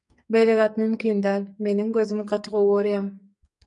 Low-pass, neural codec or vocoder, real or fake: 10.8 kHz; codec, 44.1 kHz, 2.6 kbps, SNAC; fake